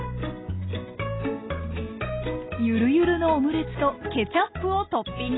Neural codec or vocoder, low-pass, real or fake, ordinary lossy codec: none; 7.2 kHz; real; AAC, 16 kbps